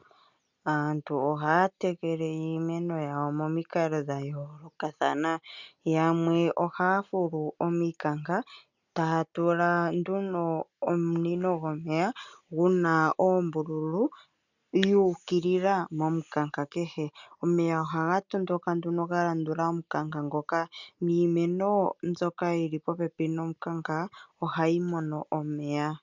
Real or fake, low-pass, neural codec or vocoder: real; 7.2 kHz; none